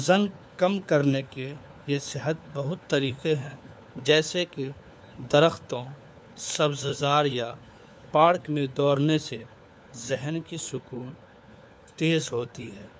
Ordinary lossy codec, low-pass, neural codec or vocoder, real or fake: none; none; codec, 16 kHz, 4 kbps, FunCodec, trained on LibriTTS, 50 frames a second; fake